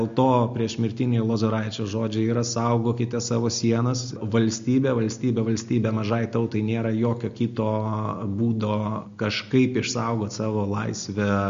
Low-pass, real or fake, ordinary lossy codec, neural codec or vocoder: 7.2 kHz; real; MP3, 48 kbps; none